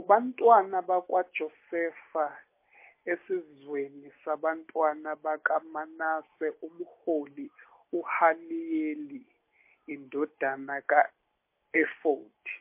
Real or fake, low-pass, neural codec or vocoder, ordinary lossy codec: real; 3.6 kHz; none; MP3, 24 kbps